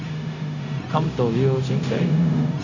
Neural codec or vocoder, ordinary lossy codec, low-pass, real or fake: codec, 16 kHz, 0.4 kbps, LongCat-Audio-Codec; none; 7.2 kHz; fake